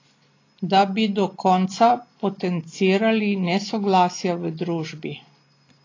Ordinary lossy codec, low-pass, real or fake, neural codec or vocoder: AAC, 32 kbps; 7.2 kHz; real; none